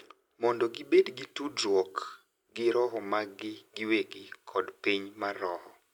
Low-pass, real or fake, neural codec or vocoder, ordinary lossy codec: 19.8 kHz; real; none; none